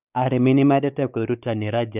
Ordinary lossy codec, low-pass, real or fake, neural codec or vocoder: none; 3.6 kHz; fake; codec, 16 kHz, 4 kbps, X-Codec, WavLM features, trained on Multilingual LibriSpeech